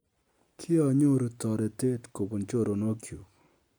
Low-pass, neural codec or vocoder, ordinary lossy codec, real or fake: none; none; none; real